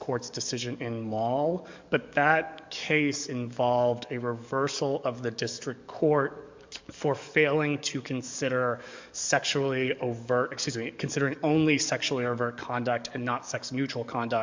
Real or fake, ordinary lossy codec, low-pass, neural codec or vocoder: fake; MP3, 64 kbps; 7.2 kHz; codec, 44.1 kHz, 7.8 kbps, DAC